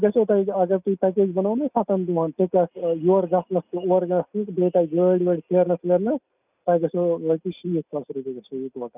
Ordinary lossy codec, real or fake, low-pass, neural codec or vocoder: none; real; 3.6 kHz; none